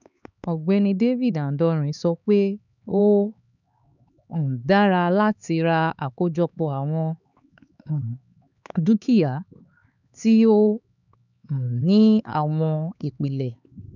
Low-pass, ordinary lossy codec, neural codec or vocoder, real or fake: 7.2 kHz; none; codec, 16 kHz, 4 kbps, X-Codec, HuBERT features, trained on LibriSpeech; fake